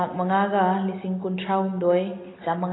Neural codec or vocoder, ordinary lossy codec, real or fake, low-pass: none; AAC, 16 kbps; real; 7.2 kHz